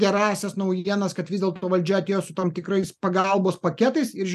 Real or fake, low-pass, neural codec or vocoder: real; 14.4 kHz; none